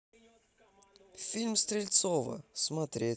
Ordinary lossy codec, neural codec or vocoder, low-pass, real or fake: none; none; none; real